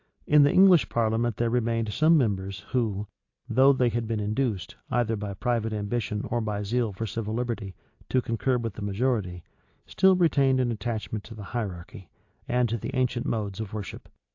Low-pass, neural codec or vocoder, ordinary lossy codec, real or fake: 7.2 kHz; none; AAC, 48 kbps; real